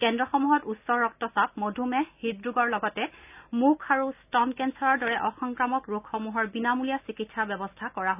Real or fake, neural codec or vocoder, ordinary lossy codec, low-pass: real; none; none; 3.6 kHz